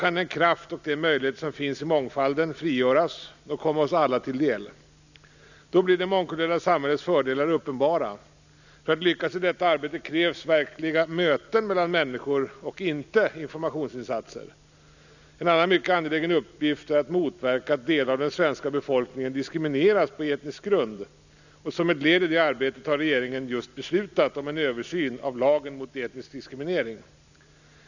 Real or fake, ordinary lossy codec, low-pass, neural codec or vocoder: real; none; 7.2 kHz; none